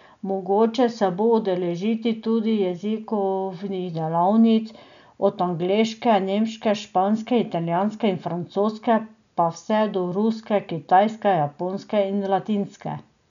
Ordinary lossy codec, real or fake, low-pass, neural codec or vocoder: none; real; 7.2 kHz; none